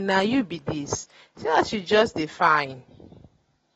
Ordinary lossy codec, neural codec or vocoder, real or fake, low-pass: AAC, 24 kbps; none; real; 19.8 kHz